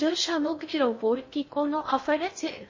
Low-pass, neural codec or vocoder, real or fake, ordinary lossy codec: 7.2 kHz; codec, 16 kHz in and 24 kHz out, 0.6 kbps, FocalCodec, streaming, 2048 codes; fake; MP3, 32 kbps